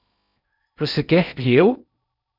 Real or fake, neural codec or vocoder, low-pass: fake; codec, 16 kHz in and 24 kHz out, 0.6 kbps, FocalCodec, streaming, 2048 codes; 5.4 kHz